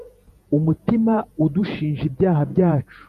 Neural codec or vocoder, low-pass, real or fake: vocoder, 48 kHz, 128 mel bands, Vocos; 14.4 kHz; fake